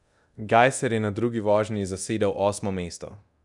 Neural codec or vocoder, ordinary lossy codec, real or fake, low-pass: codec, 24 kHz, 0.9 kbps, DualCodec; none; fake; 10.8 kHz